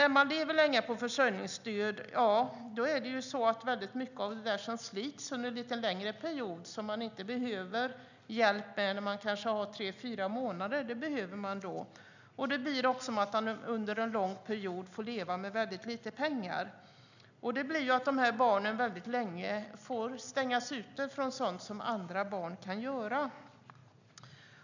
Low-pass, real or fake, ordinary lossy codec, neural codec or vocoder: 7.2 kHz; real; none; none